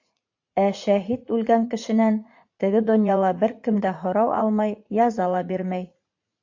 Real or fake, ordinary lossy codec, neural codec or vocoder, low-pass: fake; AAC, 48 kbps; vocoder, 44.1 kHz, 80 mel bands, Vocos; 7.2 kHz